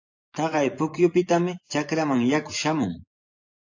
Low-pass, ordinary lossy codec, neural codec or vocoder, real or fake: 7.2 kHz; AAC, 48 kbps; vocoder, 24 kHz, 100 mel bands, Vocos; fake